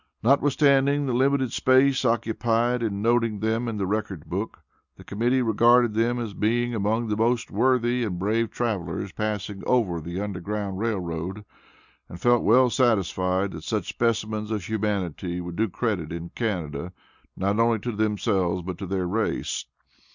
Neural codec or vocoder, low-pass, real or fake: none; 7.2 kHz; real